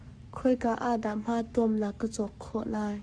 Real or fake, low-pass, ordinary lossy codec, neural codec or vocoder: fake; 9.9 kHz; Opus, 64 kbps; codec, 44.1 kHz, 7.8 kbps, Pupu-Codec